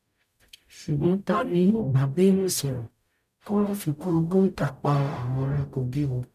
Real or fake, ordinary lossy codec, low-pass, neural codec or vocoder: fake; none; 14.4 kHz; codec, 44.1 kHz, 0.9 kbps, DAC